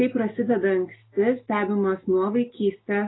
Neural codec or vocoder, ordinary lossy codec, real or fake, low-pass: none; AAC, 16 kbps; real; 7.2 kHz